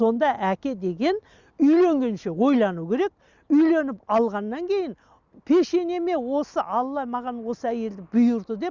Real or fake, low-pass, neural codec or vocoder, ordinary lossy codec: real; 7.2 kHz; none; Opus, 64 kbps